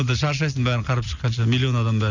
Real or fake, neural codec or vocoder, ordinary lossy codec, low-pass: real; none; none; 7.2 kHz